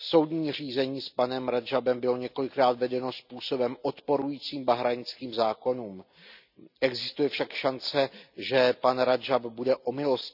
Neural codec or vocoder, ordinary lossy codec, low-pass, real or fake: none; none; 5.4 kHz; real